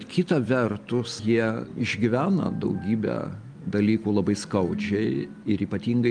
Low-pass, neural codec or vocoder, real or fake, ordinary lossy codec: 9.9 kHz; none; real; Opus, 32 kbps